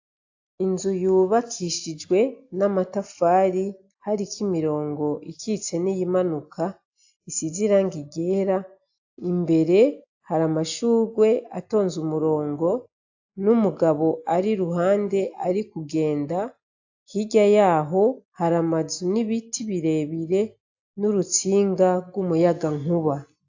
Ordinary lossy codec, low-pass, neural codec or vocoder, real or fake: AAC, 48 kbps; 7.2 kHz; none; real